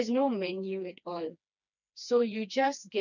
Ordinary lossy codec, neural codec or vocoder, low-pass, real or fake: none; codec, 16 kHz, 2 kbps, FreqCodec, smaller model; 7.2 kHz; fake